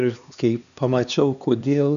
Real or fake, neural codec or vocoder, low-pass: fake; codec, 16 kHz, 2 kbps, X-Codec, HuBERT features, trained on LibriSpeech; 7.2 kHz